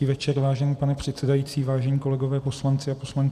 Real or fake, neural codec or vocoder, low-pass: fake; vocoder, 44.1 kHz, 128 mel bands every 256 samples, BigVGAN v2; 14.4 kHz